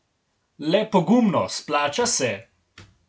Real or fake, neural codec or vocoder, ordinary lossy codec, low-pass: real; none; none; none